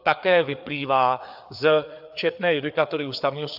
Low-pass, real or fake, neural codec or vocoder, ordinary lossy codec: 5.4 kHz; fake; codec, 16 kHz, 4 kbps, FreqCodec, larger model; AAC, 48 kbps